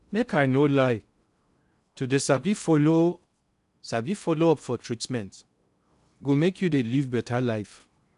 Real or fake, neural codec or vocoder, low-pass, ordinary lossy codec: fake; codec, 16 kHz in and 24 kHz out, 0.6 kbps, FocalCodec, streaming, 2048 codes; 10.8 kHz; none